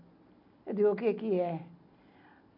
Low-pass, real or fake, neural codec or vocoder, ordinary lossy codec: 5.4 kHz; real; none; none